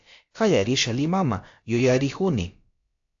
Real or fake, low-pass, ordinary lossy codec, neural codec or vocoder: fake; 7.2 kHz; AAC, 64 kbps; codec, 16 kHz, about 1 kbps, DyCAST, with the encoder's durations